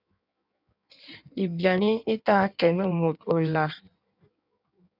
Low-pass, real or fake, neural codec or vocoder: 5.4 kHz; fake; codec, 16 kHz in and 24 kHz out, 1.1 kbps, FireRedTTS-2 codec